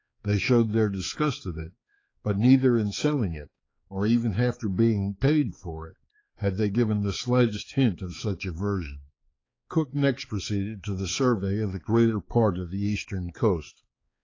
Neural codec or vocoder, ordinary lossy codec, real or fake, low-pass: codec, 16 kHz, 4 kbps, X-Codec, HuBERT features, trained on balanced general audio; AAC, 32 kbps; fake; 7.2 kHz